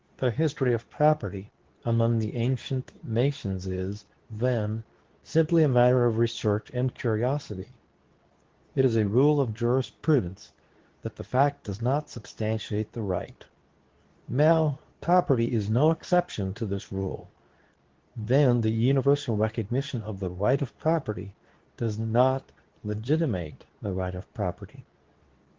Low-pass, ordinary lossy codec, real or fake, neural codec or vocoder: 7.2 kHz; Opus, 16 kbps; fake; codec, 24 kHz, 0.9 kbps, WavTokenizer, medium speech release version 2